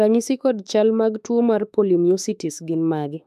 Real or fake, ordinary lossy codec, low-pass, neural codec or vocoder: fake; none; 14.4 kHz; autoencoder, 48 kHz, 32 numbers a frame, DAC-VAE, trained on Japanese speech